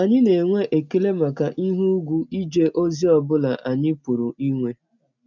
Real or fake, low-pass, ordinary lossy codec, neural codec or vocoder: real; 7.2 kHz; none; none